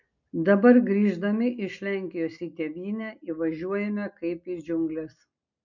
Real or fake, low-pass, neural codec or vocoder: real; 7.2 kHz; none